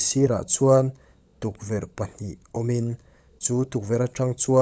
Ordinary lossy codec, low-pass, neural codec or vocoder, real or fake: none; none; codec, 16 kHz, 16 kbps, FunCodec, trained on LibriTTS, 50 frames a second; fake